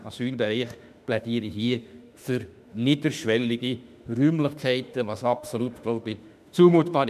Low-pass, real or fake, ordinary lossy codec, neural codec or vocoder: 14.4 kHz; fake; none; autoencoder, 48 kHz, 32 numbers a frame, DAC-VAE, trained on Japanese speech